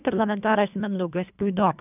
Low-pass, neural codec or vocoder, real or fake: 3.6 kHz; codec, 24 kHz, 1.5 kbps, HILCodec; fake